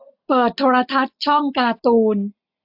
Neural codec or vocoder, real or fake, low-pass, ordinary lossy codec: none; real; 5.4 kHz; AAC, 48 kbps